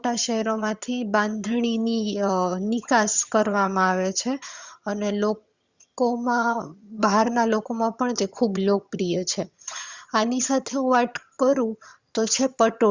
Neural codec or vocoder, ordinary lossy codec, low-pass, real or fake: vocoder, 22.05 kHz, 80 mel bands, HiFi-GAN; Opus, 64 kbps; 7.2 kHz; fake